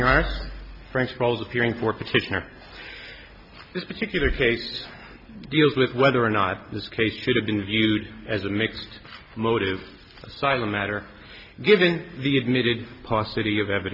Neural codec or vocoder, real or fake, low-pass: none; real; 5.4 kHz